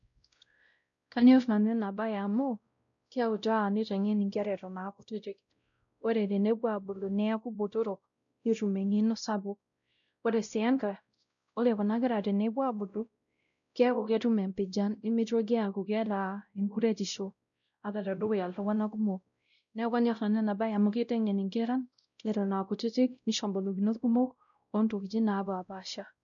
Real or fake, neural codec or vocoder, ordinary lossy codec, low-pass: fake; codec, 16 kHz, 0.5 kbps, X-Codec, WavLM features, trained on Multilingual LibriSpeech; none; 7.2 kHz